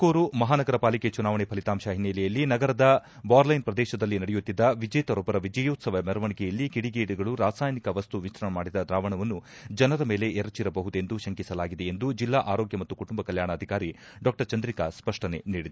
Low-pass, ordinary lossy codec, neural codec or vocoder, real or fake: none; none; none; real